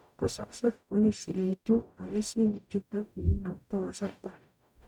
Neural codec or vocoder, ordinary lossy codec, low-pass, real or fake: codec, 44.1 kHz, 0.9 kbps, DAC; none; 19.8 kHz; fake